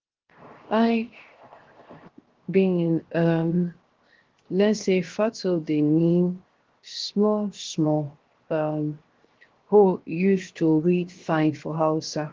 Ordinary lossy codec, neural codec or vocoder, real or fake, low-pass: Opus, 16 kbps; codec, 16 kHz, 0.7 kbps, FocalCodec; fake; 7.2 kHz